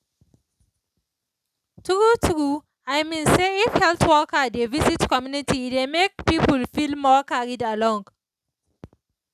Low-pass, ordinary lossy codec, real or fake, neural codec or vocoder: 14.4 kHz; none; real; none